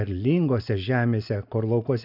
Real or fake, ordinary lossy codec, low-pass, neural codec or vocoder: real; AAC, 48 kbps; 5.4 kHz; none